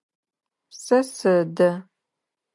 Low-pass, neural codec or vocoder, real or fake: 10.8 kHz; none; real